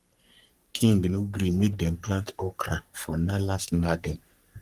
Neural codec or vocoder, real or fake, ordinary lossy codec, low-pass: codec, 44.1 kHz, 3.4 kbps, Pupu-Codec; fake; Opus, 32 kbps; 14.4 kHz